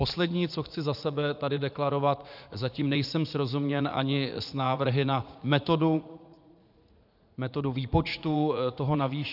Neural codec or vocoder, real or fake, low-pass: vocoder, 44.1 kHz, 80 mel bands, Vocos; fake; 5.4 kHz